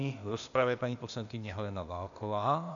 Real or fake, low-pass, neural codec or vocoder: fake; 7.2 kHz; codec, 16 kHz, 0.8 kbps, ZipCodec